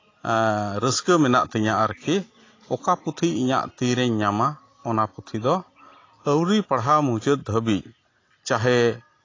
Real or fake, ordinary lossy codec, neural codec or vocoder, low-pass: real; AAC, 32 kbps; none; 7.2 kHz